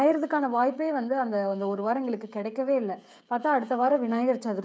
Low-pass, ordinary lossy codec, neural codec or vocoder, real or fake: none; none; codec, 16 kHz, 16 kbps, FreqCodec, smaller model; fake